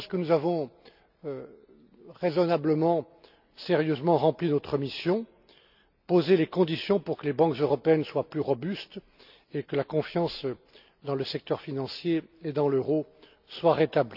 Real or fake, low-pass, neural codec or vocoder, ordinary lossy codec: real; 5.4 kHz; none; none